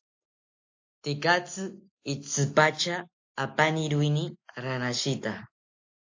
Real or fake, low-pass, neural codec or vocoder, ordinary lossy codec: real; 7.2 kHz; none; AAC, 48 kbps